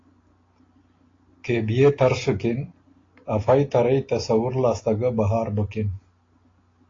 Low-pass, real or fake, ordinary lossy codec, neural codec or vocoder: 7.2 kHz; real; AAC, 32 kbps; none